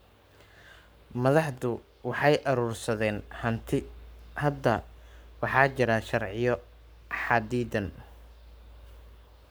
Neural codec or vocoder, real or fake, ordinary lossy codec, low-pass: codec, 44.1 kHz, 7.8 kbps, Pupu-Codec; fake; none; none